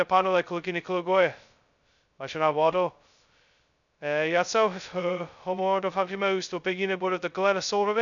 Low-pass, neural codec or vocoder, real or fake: 7.2 kHz; codec, 16 kHz, 0.2 kbps, FocalCodec; fake